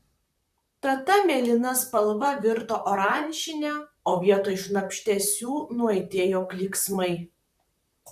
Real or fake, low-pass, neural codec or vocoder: fake; 14.4 kHz; vocoder, 44.1 kHz, 128 mel bands, Pupu-Vocoder